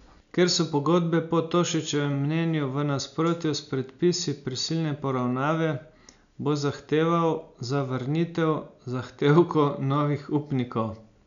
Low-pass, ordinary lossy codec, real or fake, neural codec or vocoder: 7.2 kHz; none; real; none